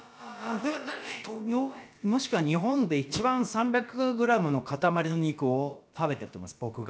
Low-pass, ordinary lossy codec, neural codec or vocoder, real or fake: none; none; codec, 16 kHz, about 1 kbps, DyCAST, with the encoder's durations; fake